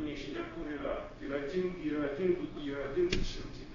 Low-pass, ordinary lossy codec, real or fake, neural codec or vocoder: 7.2 kHz; AAC, 32 kbps; fake; codec, 16 kHz, 0.9 kbps, LongCat-Audio-Codec